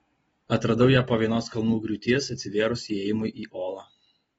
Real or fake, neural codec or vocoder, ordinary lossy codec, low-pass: real; none; AAC, 24 kbps; 19.8 kHz